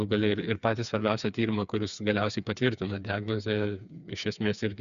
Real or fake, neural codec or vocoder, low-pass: fake; codec, 16 kHz, 4 kbps, FreqCodec, smaller model; 7.2 kHz